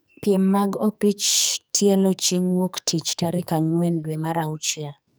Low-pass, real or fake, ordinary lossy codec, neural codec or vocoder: none; fake; none; codec, 44.1 kHz, 2.6 kbps, SNAC